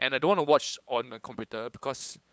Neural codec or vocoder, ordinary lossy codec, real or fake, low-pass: codec, 16 kHz, 8 kbps, FunCodec, trained on LibriTTS, 25 frames a second; none; fake; none